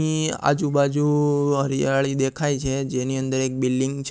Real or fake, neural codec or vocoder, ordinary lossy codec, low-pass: real; none; none; none